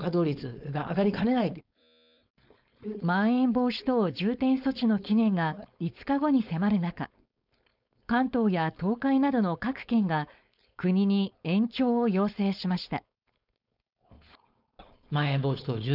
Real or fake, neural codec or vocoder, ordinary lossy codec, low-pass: fake; codec, 16 kHz, 4.8 kbps, FACodec; none; 5.4 kHz